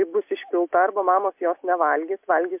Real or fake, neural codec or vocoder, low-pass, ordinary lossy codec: real; none; 3.6 kHz; MP3, 32 kbps